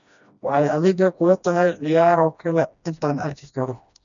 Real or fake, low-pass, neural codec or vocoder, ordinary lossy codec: fake; 7.2 kHz; codec, 16 kHz, 1 kbps, FreqCodec, smaller model; none